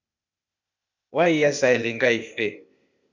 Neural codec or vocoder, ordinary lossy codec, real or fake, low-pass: codec, 16 kHz, 0.8 kbps, ZipCodec; MP3, 64 kbps; fake; 7.2 kHz